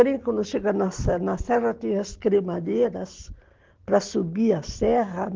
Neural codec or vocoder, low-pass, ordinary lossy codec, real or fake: none; 7.2 kHz; Opus, 16 kbps; real